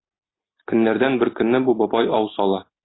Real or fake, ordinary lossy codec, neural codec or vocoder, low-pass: real; AAC, 16 kbps; none; 7.2 kHz